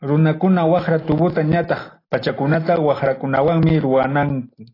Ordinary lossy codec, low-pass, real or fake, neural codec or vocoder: AAC, 24 kbps; 5.4 kHz; real; none